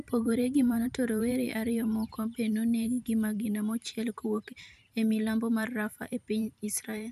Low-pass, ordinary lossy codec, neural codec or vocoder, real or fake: 14.4 kHz; none; vocoder, 44.1 kHz, 128 mel bands every 512 samples, BigVGAN v2; fake